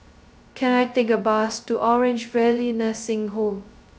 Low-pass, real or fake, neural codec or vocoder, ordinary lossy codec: none; fake; codec, 16 kHz, 0.2 kbps, FocalCodec; none